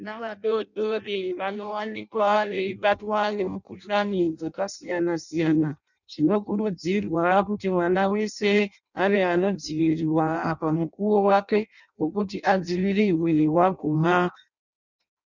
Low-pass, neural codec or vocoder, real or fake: 7.2 kHz; codec, 16 kHz in and 24 kHz out, 0.6 kbps, FireRedTTS-2 codec; fake